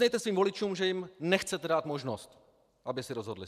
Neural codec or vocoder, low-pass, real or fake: vocoder, 44.1 kHz, 128 mel bands every 512 samples, BigVGAN v2; 14.4 kHz; fake